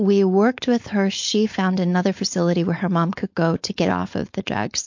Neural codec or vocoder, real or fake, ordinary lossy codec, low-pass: codec, 16 kHz, 4.8 kbps, FACodec; fake; MP3, 48 kbps; 7.2 kHz